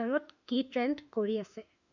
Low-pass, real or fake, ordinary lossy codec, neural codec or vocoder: 7.2 kHz; fake; none; autoencoder, 48 kHz, 32 numbers a frame, DAC-VAE, trained on Japanese speech